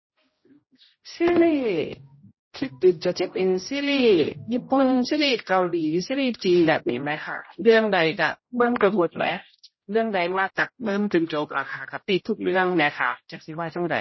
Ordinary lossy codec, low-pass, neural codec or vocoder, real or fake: MP3, 24 kbps; 7.2 kHz; codec, 16 kHz, 0.5 kbps, X-Codec, HuBERT features, trained on general audio; fake